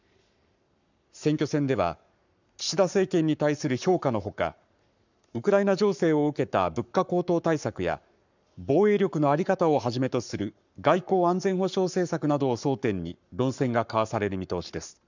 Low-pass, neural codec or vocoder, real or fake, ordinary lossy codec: 7.2 kHz; codec, 44.1 kHz, 7.8 kbps, Pupu-Codec; fake; none